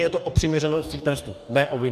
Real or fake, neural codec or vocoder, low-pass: fake; codec, 44.1 kHz, 2.6 kbps, DAC; 14.4 kHz